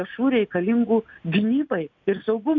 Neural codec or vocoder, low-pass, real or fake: none; 7.2 kHz; real